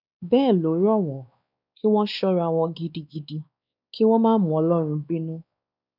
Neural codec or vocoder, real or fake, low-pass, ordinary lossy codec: codec, 16 kHz, 2 kbps, X-Codec, WavLM features, trained on Multilingual LibriSpeech; fake; 5.4 kHz; none